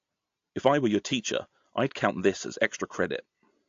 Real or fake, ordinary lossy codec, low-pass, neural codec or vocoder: real; AAC, 48 kbps; 7.2 kHz; none